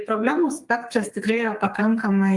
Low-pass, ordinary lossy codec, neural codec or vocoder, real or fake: 10.8 kHz; Opus, 32 kbps; codec, 32 kHz, 1.9 kbps, SNAC; fake